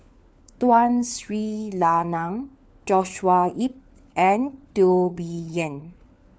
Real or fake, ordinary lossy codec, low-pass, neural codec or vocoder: fake; none; none; codec, 16 kHz, 4 kbps, FunCodec, trained on LibriTTS, 50 frames a second